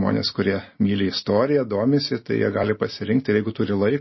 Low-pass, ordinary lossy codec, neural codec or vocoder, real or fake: 7.2 kHz; MP3, 24 kbps; none; real